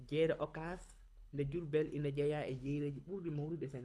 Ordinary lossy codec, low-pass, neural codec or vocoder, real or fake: none; none; codec, 24 kHz, 6 kbps, HILCodec; fake